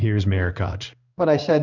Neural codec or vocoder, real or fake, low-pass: codec, 16 kHz in and 24 kHz out, 1 kbps, XY-Tokenizer; fake; 7.2 kHz